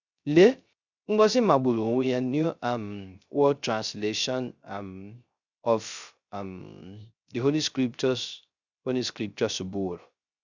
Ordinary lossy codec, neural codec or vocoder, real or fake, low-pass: Opus, 64 kbps; codec, 16 kHz, 0.3 kbps, FocalCodec; fake; 7.2 kHz